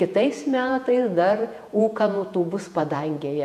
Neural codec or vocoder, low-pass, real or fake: vocoder, 48 kHz, 128 mel bands, Vocos; 14.4 kHz; fake